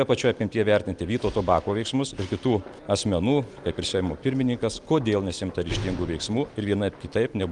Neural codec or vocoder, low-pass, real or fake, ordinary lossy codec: none; 10.8 kHz; real; Opus, 32 kbps